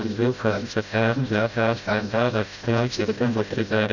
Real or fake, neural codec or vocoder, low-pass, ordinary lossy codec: fake; codec, 16 kHz, 0.5 kbps, FreqCodec, smaller model; 7.2 kHz; none